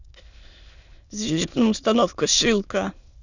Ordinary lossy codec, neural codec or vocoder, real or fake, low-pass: none; autoencoder, 22.05 kHz, a latent of 192 numbers a frame, VITS, trained on many speakers; fake; 7.2 kHz